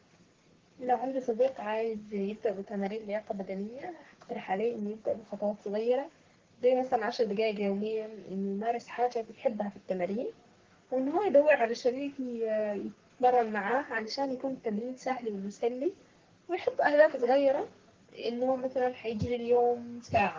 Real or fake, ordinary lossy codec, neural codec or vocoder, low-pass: fake; Opus, 16 kbps; codec, 44.1 kHz, 3.4 kbps, Pupu-Codec; 7.2 kHz